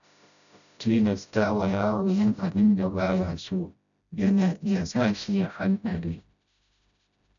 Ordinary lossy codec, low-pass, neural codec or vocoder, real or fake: none; 7.2 kHz; codec, 16 kHz, 0.5 kbps, FreqCodec, smaller model; fake